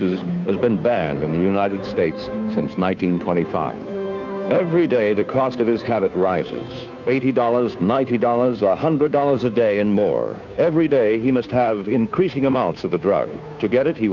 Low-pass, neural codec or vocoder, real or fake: 7.2 kHz; codec, 16 kHz, 2 kbps, FunCodec, trained on Chinese and English, 25 frames a second; fake